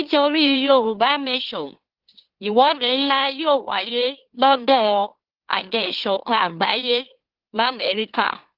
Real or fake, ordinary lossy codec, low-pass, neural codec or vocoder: fake; Opus, 16 kbps; 5.4 kHz; autoencoder, 44.1 kHz, a latent of 192 numbers a frame, MeloTTS